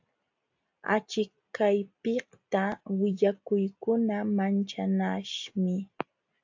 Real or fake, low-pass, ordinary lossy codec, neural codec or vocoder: real; 7.2 kHz; AAC, 48 kbps; none